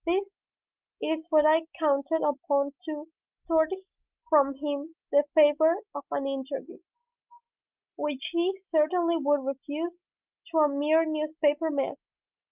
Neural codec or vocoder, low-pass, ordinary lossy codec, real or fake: none; 3.6 kHz; Opus, 24 kbps; real